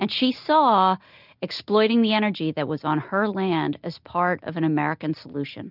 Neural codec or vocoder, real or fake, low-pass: none; real; 5.4 kHz